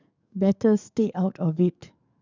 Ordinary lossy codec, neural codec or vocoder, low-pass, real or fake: none; codec, 44.1 kHz, 7.8 kbps, DAC; 7.2 kHz; fake